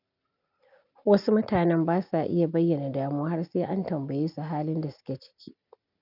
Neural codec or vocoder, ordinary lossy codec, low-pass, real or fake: none; none; 5.4 kHz; real